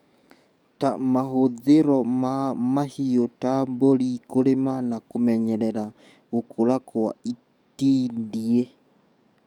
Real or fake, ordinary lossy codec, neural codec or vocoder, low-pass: fake; none; codec, 44.1 kHz, 7.8 kbps, DAC; 19.8 kHz